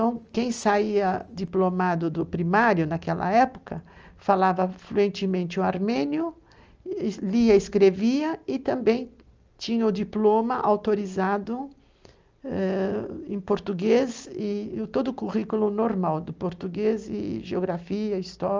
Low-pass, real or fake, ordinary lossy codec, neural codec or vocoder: 7.2 kHz; real; Opus, 24 kbps; none